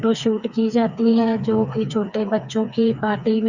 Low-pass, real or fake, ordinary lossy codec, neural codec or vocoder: 7.2 kHz; fake; Opus, 64 kbps; codec, 16 kHz, 4 kbps, FreqCodec, smaller model